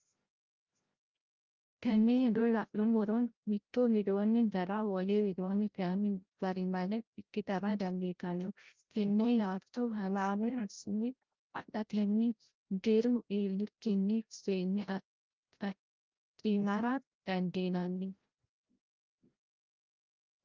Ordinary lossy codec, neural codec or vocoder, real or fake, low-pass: Opus, 32 kbps; codec, 16 kHz, 0.5 kbps, FreqCodec, larger model; fake; 7.2 kHz